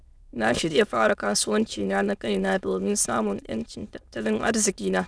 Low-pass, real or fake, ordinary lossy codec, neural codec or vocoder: none; fake; none; autoencoder, 22.05 kHz, a latent of 192 numbers a frame, VITS, trained on many speakers